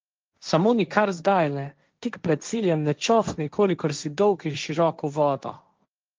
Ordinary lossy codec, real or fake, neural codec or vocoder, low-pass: Opus, 24 kbps; fake; codec, 16 kHz, 1.1 kbps, Voila-Tokenizer; 7.2 kHz